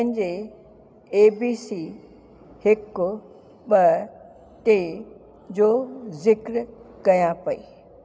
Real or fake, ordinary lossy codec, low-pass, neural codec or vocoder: real; none; none; none